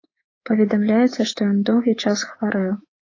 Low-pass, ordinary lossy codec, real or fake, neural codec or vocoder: 7.2 kHz; AAC, 32 kbps; fake; codec, 24 kHz, 3.1 kbps, DualCodec